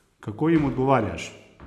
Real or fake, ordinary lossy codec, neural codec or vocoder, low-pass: real; none; none; 14.4 kHz